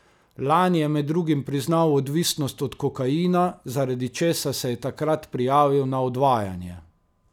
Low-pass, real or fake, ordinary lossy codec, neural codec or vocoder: 19.8 kHz; real; none; none